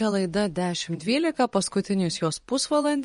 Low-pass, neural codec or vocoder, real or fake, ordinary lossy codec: 10.8 kHz; vocoder, 24 kHz, 100 mel bands, Vocos; fake; MP3, 48 kbps